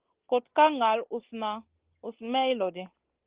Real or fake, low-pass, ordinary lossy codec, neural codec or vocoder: real; 3.6 kHz; Opus, 16 kbps; none